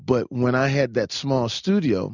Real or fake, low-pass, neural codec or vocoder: real; 7.2 kHz; none